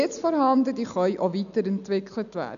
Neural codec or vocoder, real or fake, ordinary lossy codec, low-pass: none; real; none; 7.2 kHz